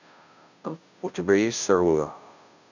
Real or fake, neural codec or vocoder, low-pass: fake; codec, 16 kHz, 0.5 kbps, FunCodec, trained on Chinese and English, 25 frames a second; 7.2 kHz